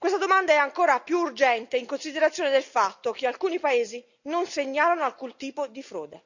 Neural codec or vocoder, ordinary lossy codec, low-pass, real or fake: none; none; 7.2 kHz; real